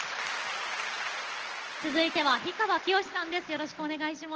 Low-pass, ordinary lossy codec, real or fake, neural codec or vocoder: 7.2 kHz; Opus, 16 kbps; real; none